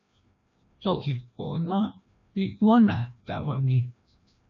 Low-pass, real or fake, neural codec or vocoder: 7.2 kHz; fake; codec, 16 kHz, 1 kbps, FreqCodec, larger model